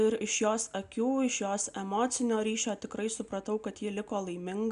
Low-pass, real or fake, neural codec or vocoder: 10.8 kHz; real; none